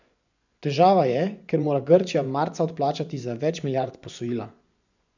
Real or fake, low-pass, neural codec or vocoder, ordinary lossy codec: fake; 7.2 kHz; vocoder, 24 kHz, 100 mel bands, Vocos; none